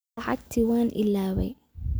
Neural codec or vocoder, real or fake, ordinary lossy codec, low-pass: none; real; none; none